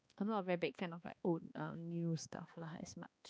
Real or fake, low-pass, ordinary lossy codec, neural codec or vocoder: fake; none; none; codec, 16 kHz, 2 kbps, X-Codec, HuBERT features, trained on balanced general audio